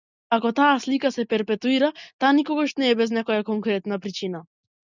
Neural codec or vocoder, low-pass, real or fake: none; 7.2 kHz; real